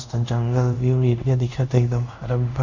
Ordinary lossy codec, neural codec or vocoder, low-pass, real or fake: none; codec, 24 kHz, 0.5 kbps, DualCodec; 7.2 kHz; fake